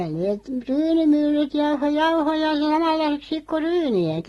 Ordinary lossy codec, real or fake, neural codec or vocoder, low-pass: AAC, 32 kbps; real; none; 19.8 kHz